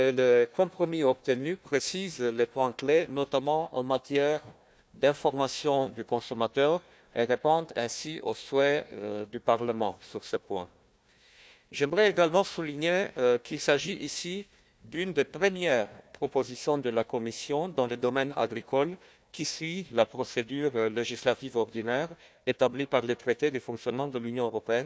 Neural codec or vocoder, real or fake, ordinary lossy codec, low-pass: codec, 16 kHz, 1 kbps, FunCodec, trained on Chinese and English, 50 frames a second; fake; none; none